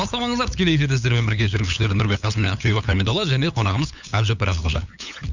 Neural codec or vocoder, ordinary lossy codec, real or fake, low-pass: codec, 16 kHz, 8 kbps, FunCodec, trained on LibriTTS, 25 frames a second; none; fake; 7.2 kHz